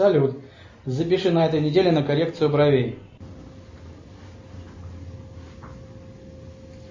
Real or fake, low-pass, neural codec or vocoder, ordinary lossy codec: real; 7.2 kHz; none; MP3, 32 kbps